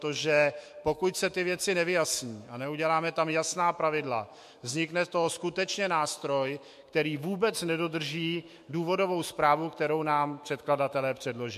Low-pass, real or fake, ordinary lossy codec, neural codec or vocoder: 14.4 kHz; fake; MP3, 64 kbps; autoencoder, 48 kHz, 128 numbers a frame, DAC-VAE, trained on Japanese speech